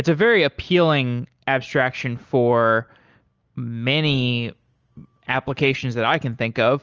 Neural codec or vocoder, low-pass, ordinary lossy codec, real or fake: none; 7.2 kHz; Opus, 24 kbps; real